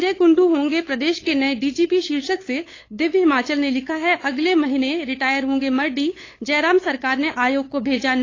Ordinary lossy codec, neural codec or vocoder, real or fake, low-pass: AAC, 32 kbps; codec, 16 kHz, 8 kbps, FunCodec, trained on LibriTTS, 25 frames a second; fake; 7.2 kHz